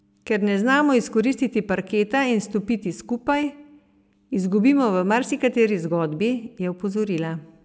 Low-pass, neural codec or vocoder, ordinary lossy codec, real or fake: none; none; none; real